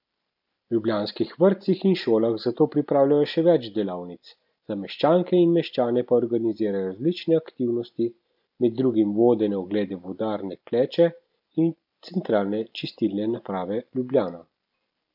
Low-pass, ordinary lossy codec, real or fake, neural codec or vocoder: 5.4 kHz; none; real; none